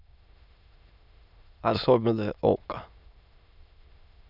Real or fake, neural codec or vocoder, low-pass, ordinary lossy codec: fake; autoencoder, 22.05 kHz, a latent of 192 numbers a frame, VITS, trained on many speakers; 5.4 kHz; none